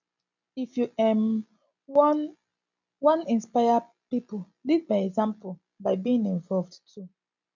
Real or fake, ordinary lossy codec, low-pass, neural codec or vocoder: real; none; 7.2 kHz; none